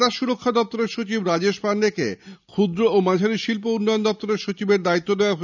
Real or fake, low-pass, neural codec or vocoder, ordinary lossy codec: real; 7.2 kHz; none; none